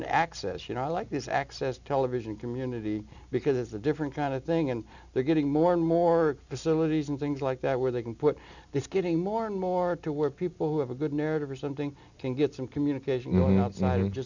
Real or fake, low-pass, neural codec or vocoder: real; 7.2 kHz; none